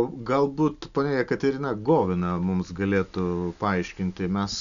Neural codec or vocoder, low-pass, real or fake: none; 7.2 kHz; real